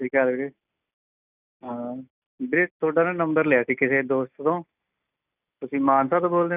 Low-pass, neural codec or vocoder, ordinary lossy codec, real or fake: 3.6 kHz; none; none; real